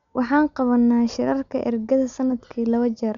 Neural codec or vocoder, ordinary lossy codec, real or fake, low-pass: none; none; real; 7.2 kHz